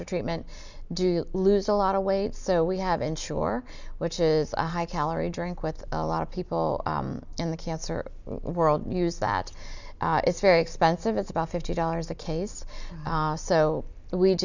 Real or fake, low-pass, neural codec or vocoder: real; 7.2 kHz; none